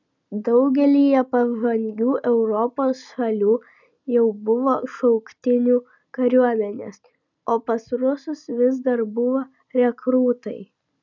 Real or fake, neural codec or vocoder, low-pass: real; none; 7.2 kHz